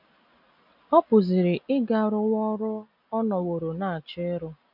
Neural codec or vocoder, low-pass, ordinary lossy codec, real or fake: none; 5.4 kHz; none; real